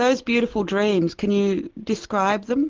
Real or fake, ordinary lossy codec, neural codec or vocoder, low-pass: real; Opus, 16 kbps; none; 7.2 kHz